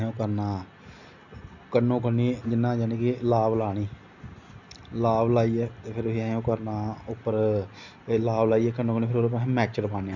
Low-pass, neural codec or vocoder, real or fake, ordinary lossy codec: 7.2 kHz; none; real; none